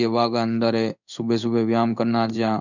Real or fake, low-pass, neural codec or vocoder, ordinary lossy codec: fake; 7.2 kHz; codec, 16 kHz in and 24 kHz out, 1 kbps, XY-Tokenizer; none